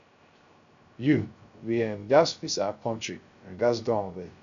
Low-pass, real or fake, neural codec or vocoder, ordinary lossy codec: 7.2 kHz; fake; codec, 16 kHz, 0.3 kbps, FocalCodec; none